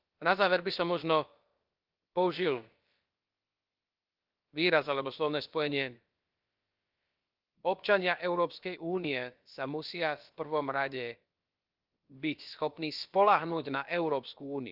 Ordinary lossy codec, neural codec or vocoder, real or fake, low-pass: Opus, 32 kbps; codec, 16 kHz, about 1 kbps, DyCAST, with the encoder's durations; fake; 5.4 kHz